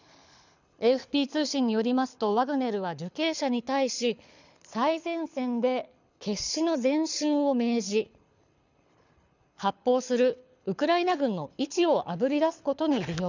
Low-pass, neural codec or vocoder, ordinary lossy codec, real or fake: 7.2 kHz; codec, 24 kHz, 6 kbps, HILCodec; none; fake